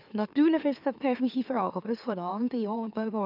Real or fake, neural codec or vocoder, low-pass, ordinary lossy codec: fake; autoencoder, 44.1 kHz, a latent of 192 numbers a frame, MeloTTS; 5.4 kHz; none